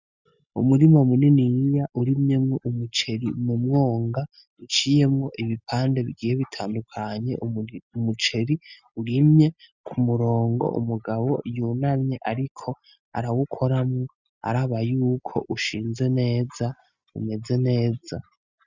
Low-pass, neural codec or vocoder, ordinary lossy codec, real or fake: 7.2 kHz; none; Opus, 64 kbps; real